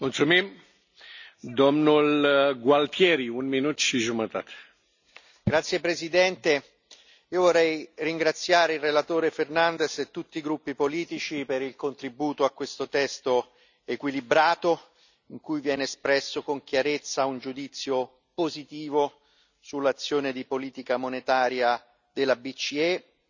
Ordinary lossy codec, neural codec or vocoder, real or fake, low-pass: none; none; real; 7.2 kHz